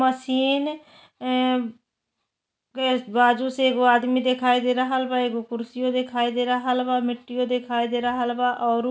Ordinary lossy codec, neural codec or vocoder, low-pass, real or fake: none; none; none; real